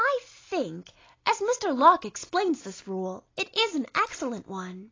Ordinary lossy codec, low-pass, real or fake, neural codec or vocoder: AAC, 32 kbps; 7.2 kHz; real; none